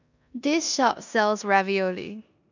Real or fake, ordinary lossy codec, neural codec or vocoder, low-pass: fake; none; codec, 16 kHz in and 24 kHz out, 0.9 kbps, LongCat-Audio-Codec, four codebook decoder; 7.2 kHz